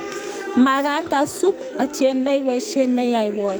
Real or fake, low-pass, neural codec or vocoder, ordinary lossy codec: fake; none; codec, 44.1 kHz, 2.6 kbps, SNAC; none